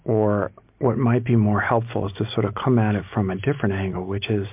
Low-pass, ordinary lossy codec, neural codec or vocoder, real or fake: 3.6 kHz; MP3, 32 kbps; none; real